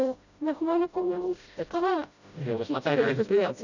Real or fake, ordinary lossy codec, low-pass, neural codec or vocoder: fake; none; 7.2 kHz; codec, 16 kHz, 0.5 kbps, FreqCodec, smaller model